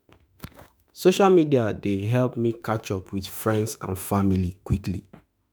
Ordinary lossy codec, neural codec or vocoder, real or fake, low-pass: none; autoencoder, 48 kHz, 32 numbers a frame, DAC-VAE, trained on Japanese speech; fake; none